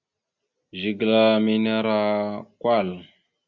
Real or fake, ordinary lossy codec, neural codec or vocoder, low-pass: real; Opus, 64 kbps; none; 7.2 kHz